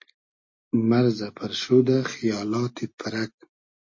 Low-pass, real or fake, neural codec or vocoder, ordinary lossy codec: 7.2 kHz; real; none; MP3, 32 kbps